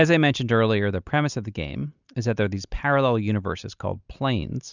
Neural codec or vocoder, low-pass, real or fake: none; 7.2 kHz; real